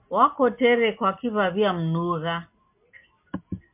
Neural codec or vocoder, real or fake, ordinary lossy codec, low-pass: none; real; MP3, 32 kbps; 3.6 kHz